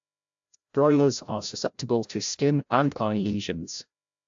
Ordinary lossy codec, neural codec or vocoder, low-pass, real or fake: AAC, 64 kbps; codec, 16 kHz, 0.5 kbps, FreqCodec, larger model; 7.2 kHz; fake